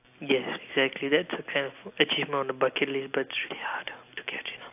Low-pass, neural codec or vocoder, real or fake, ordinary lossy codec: 3.6 kHz; none; real; AAC, 32 kbps